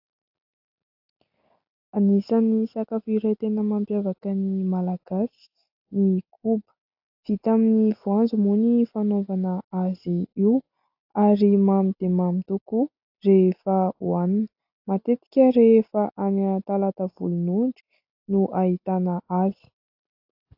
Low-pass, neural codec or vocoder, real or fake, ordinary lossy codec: 5.4 kHz; none; real; AAC, 48 kbps